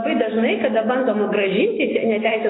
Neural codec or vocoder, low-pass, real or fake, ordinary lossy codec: none; 7.2 kHz; real; AAC, 16 kbps